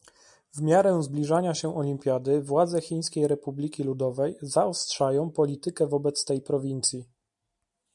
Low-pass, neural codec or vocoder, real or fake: 10.8 kHz; none; real